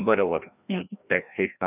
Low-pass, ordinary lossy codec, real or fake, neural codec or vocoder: 3.6 kHz; none; fake; codec, 16 kHz, 1 kbps, FreqCodec, larger model